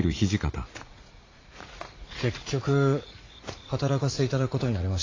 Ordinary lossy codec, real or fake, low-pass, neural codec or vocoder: AAC, 32 kbps; fake; 7.2 kHz; vocoder, 44.1 kHz, 80 mel bands, Vocos